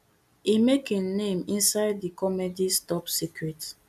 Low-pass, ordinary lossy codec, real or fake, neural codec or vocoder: 14.4 kHz; none; real; none